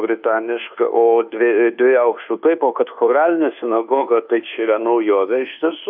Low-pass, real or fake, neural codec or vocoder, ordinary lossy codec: 5.4 kHz; fake; codec, 24 kHz, 1.2 kbps, DualCodec; AAC, 48 kbps